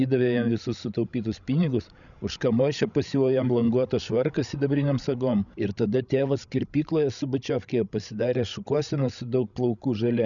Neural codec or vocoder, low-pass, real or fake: codec, 16 kHz, 16 kbps, FreqCodec, larger model; 7.2 kHz; fake